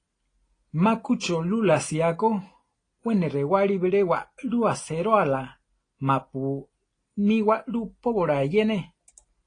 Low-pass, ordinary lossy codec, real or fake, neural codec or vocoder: 9.9 kHz; AAC, 32 kbps; real; none